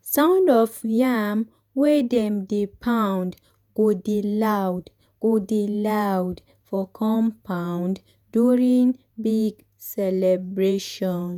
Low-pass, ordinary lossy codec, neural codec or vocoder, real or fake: 19.8 kHz; none; vocoder, 48 kHz, 128 mel bands, Vocos; fake